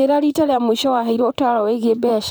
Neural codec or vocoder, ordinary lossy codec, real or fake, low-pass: vocoder, 44.1 kHz, 128 mel bands, Pupu-Vocoder; none; fake; none